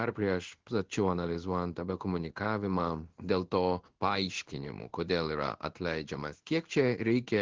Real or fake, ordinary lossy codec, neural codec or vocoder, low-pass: fake; Opus, 16 kbps; codec, 16 kHz in and 24 kHz out, 1 kbps, XY-Tokenizer; 7.2 kHz